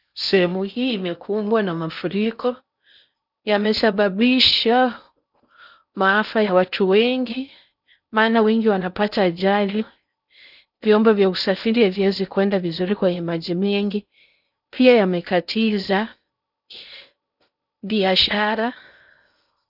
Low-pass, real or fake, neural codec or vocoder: 5.4 kHz; fake; codec, 16 kHz in and 24 kHz out, 0.6 kbps, FocalCodec, streaming, 4096 codes